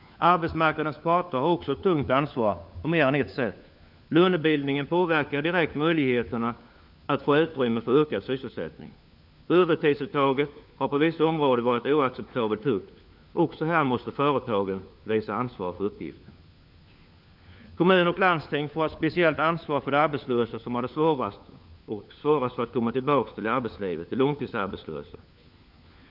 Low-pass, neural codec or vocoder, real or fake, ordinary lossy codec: 5.4 kHz; codec, 16 kHz, 4 kbps, FunCodec, trained on LibriTTS, 50 frames a second; fake; none